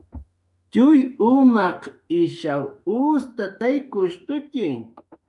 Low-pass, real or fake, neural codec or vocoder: 10.8 kHz; fake; autoencoder, 48 kHz, 32 numbers a frame, DAC-VAE, trained on Japanese speech